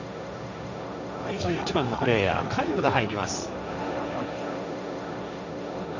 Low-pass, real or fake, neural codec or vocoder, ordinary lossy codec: 7.2 kHz; fake; codec, 16 kHz, 1.1 kbps, Voila-Tokenizer; none